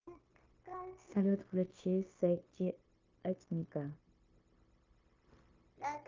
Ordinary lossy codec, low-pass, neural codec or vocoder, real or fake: Opus, 32 kbps; 7.2 kHz; codec, 16 kHz, 0.9 kbps, LongCat-Audio-Codec; fake